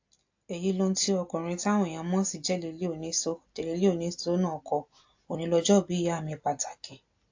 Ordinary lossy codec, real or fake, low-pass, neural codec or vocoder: AAC, 48 kbps; real; 7.2 kHz; none